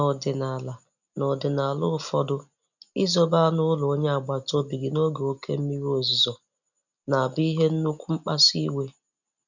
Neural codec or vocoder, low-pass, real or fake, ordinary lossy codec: none; 7.2 kHz; real; none